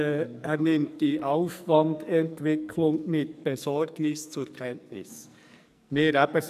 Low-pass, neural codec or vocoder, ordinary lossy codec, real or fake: 14.4 kHz; codec, 44.1 kHz, 2.6 kbps, SNAC; none; fake